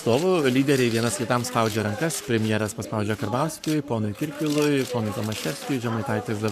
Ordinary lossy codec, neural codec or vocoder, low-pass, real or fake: MP3, 96 kbps; codec, 44.1 kHz, 7.8 kbps, Pupu-Codec; 14.4 kHz; fake